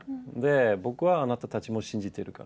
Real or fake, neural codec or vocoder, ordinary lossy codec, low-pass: real; none; none; none